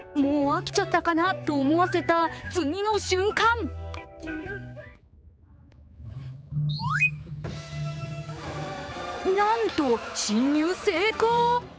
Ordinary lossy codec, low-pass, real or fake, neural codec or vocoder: none; none; fake; codec, 16 kHz, 4 kbps, X-Codec, HuBERT features, trained on general audio